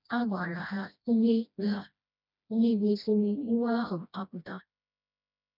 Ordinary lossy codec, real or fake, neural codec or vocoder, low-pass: none; fake; codec, 16 kHz, 1 kbps, FreqCodec, smaller model; 5.4 kHz